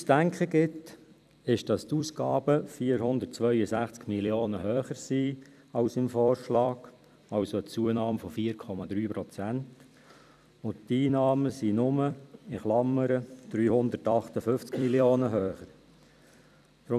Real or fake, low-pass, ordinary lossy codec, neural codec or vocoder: fake; 14.4 kHz; none; vocoder, 44.1 kHz, 128 mel bands every 256 samples, BigVGAN v2